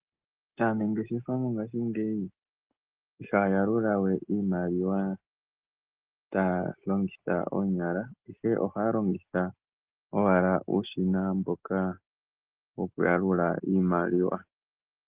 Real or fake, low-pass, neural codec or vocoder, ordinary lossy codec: real; 3.6 kHz; none; Opus, 16 kbps